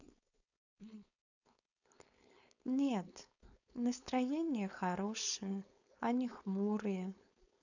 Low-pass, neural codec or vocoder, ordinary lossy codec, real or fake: 7.2 kHz; codec, 16 kHz, 4.8 kbps, FACodec; none; fake